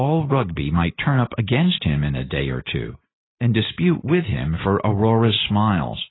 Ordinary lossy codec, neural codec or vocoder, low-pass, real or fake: AAC, 16 kbps; none; 7.2 kHz; real